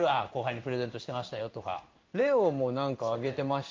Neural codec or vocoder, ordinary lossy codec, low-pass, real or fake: codec, 16 kHz in and 24 kHz out, 1 kbps, XY-Tokenizer; Opus, 24 kbps; 7.2 kHz; fake